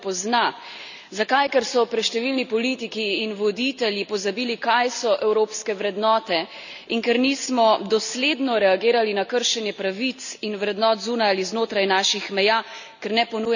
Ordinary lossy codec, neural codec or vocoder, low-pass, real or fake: none; none; 7.2 kHz; real